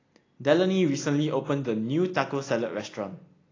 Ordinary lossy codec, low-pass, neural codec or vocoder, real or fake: AAC, 32 kbps; 7.2 kHz; none; real